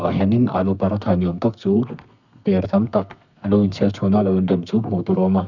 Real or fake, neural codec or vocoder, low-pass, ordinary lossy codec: fake; codec, 32 kHz, 1.9 kbps, SNAC; 7.2 kHz; none